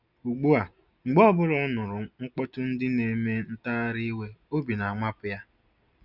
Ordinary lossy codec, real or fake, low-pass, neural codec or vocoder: none; real; 5.4 kHz; none